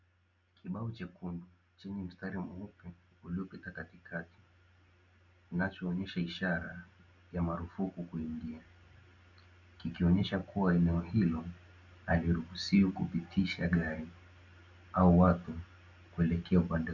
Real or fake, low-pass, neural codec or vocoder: real; 7.2 kHz; none